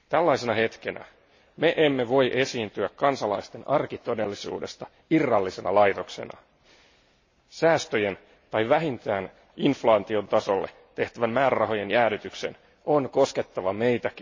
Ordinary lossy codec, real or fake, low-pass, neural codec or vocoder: MP3, 32 kbps; real; 7.2 kHz; none